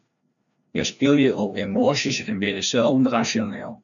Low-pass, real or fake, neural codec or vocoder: 7.2 kHz; fake; codec, 16 kHz, 1 kbps, FreqCodec, larger model